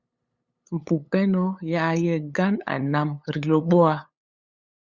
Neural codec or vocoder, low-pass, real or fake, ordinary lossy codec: codec, 16 kHz, 8 kbps, FunCodec, trained on LibriTTS, 25 frames a second; 7.2 kHz; fake; Opus, 64 kbps